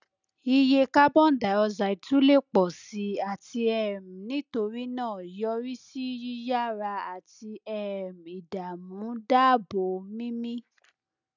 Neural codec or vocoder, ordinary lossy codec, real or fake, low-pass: none; none; real; 7.2 kHz